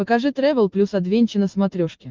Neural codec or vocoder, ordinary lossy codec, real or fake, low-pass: none; Opus, 32 kbps; real; 7.2 kHz